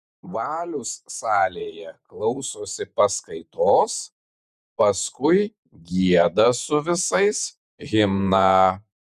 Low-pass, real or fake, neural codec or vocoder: 14.4 kHz; fake; vocoder, 48 kHz, 128 mel bands, Vocos